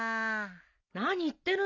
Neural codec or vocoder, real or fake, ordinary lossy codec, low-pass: none; real; none; 7.2 kHz